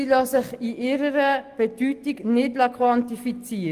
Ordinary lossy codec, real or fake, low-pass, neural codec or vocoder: Opus, 24 kbps; real; 14.4 kHz; none